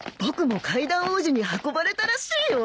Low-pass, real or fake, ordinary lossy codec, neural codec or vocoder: none; real; none; none